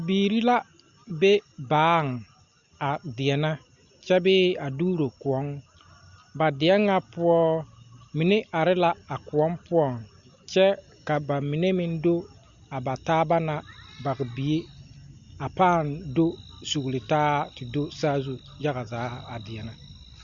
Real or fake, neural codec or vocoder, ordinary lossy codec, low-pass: real; none; Opus, 64 kbps; 7.2 kHz